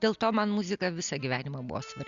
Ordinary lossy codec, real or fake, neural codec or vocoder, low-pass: Opus, 64 kbps; real; none; 7.2 kHz